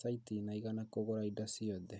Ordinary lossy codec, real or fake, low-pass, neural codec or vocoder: none; real; none; none